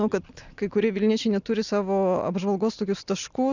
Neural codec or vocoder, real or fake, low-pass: none; real; 7.2 kHz